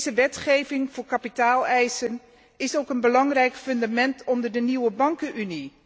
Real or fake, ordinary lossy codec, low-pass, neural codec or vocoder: real; none; none; none